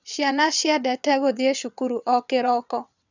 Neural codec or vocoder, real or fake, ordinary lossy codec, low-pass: vocoder, 22.05 kHz, 80 mel bands, WaveNeXt; fake; none; 7.2 kHz